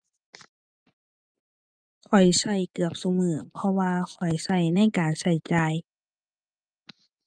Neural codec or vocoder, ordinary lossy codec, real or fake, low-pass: vocoder, 24 kHz, 100 mel bands, Vocos; Opus, 32 kbps; fake; 9.9 kHz